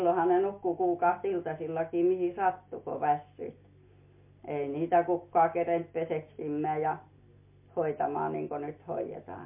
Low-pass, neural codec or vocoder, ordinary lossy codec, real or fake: 3.6 kHz; none; MP3, 24 kbps; real